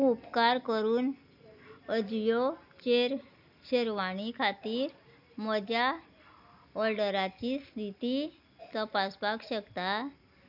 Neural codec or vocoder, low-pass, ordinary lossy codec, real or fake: none; 5.4 kHz; none; real